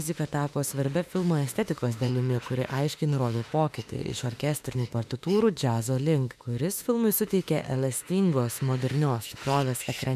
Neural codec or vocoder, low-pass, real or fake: autoencoder, 48 kHz, 32 numbers a frame, DAC-VAE, trained on Japanese speech; 14.4 kHz; fake